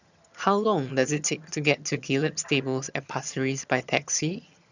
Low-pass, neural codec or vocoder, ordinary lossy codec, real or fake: 7.2 kHz; vocoder, 22.05 kHz, 80 mel bands, HiFi-GAN; none; fake